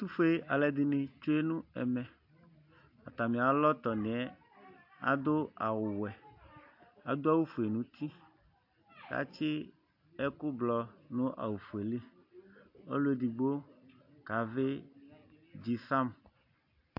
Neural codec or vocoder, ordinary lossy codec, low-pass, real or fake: none; AAC, 48 kbps; 5.4 kHz; real